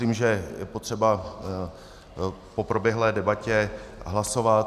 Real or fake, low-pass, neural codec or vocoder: real; 14.4 kHz; none